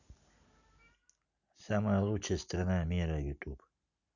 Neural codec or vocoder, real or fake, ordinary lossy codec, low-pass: none; real; none; 7.2 kHz